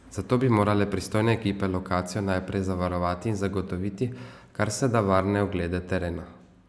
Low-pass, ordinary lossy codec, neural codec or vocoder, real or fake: none; none; none; real